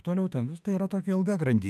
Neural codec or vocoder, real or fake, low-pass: autoencoder, 48 kHz, 32 numbers a frame, DAC-VAE, trained on Japanese speech; fake; 14.4 kHz